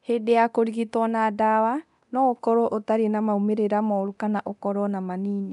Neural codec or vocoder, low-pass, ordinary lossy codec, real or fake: codec, 24 kHz, 0.9 kbps, DualCodec; 10.8 kHz; none; fake